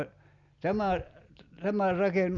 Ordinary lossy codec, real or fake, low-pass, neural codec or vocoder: Opus, 64 kbps; real; 7.2 kHz; none